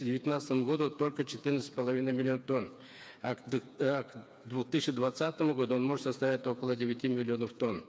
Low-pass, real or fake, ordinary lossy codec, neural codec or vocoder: none; fake; none; codec, 16 kHz, 4 kbps, FreqCodec, smaller model